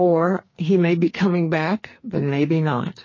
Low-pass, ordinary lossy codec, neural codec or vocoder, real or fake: 7.2 kHz; MP3, 32 kbps; codec, 44.1 kHz, 2.6 kbps, SNAC; fake